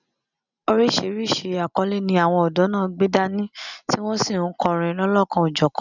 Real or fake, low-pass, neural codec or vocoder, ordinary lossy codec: real; 7.2 kHz; none; none